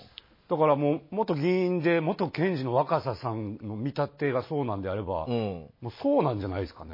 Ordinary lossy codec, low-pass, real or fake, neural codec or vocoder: MP3, 24 kbps; 5.4 kHz; real; none